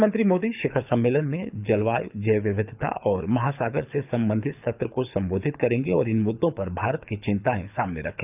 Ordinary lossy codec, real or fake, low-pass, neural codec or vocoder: none; fake; 3.6 kHz; codec, 24 kHz, 6 kbps, HILCodec